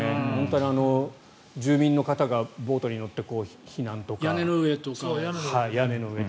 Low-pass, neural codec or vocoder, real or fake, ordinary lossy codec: none; none; real; none